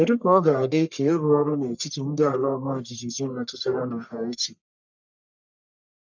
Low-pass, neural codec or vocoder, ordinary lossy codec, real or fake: 7.2 kHz; codec, 44.1 kHz, 1.7 kbps, Pupu-Codec; none; fake